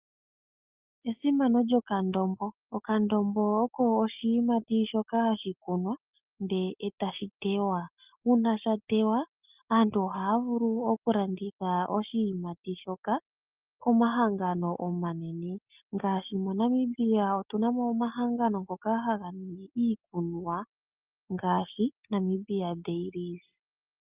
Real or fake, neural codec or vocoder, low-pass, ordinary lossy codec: real; none; 3.6 kHz; Opus, 24 kbps